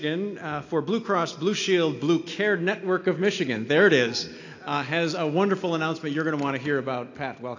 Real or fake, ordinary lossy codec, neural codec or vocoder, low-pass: real; AAC, 48 kbps; none; 7.2 kHz